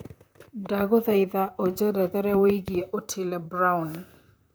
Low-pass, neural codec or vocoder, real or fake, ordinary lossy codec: none; vocoder, 44.1 kHz, 128 mel bands, Pupu-Vocoder; fake; none